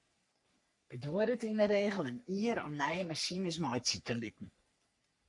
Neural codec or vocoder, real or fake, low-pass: codec, 44.1 kHz, 3.4 kbps, Pupu-Codec; fake; 10.8 kHz